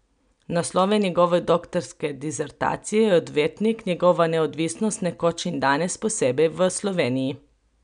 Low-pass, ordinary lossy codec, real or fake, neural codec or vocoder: 9.9 kHz; none; real; none